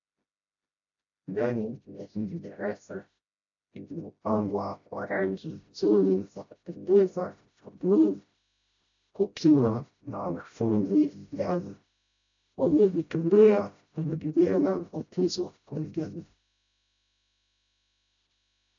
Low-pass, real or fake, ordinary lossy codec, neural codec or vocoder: 7.2 kHz; fake; AAC, 64 kbps; codec, 16 kHz, 0.5 kbps, FreqCodec, smaller model